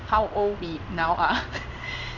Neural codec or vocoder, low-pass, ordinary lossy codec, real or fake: codec, 16 kHz in and 24 kHz out, 1 kbps, XY-Tokenizer; 7.2 kHz; none; fake